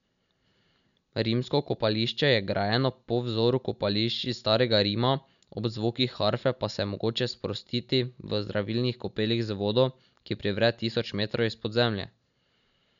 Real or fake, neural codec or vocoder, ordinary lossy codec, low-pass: real; none; none; 7.2 kHz